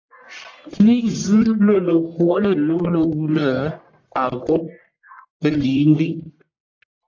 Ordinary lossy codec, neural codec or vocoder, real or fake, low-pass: AAC, 48 kbps; codec, 44.1 kHz, 1.7 kbps, Pupu-Codec; fake; 7.2 kHz